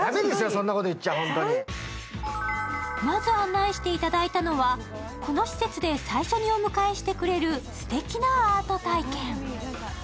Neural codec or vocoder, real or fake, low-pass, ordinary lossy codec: none; real; none; none